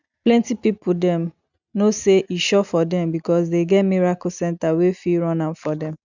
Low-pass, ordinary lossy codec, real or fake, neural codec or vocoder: 7.2 kHz; none; real; none